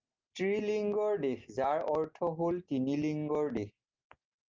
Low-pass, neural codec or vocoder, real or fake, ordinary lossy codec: 7.2 kHz; none; real; Opus, 24 kbps